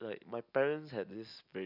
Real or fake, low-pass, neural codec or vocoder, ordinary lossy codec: real; 5.4 kHz; none; none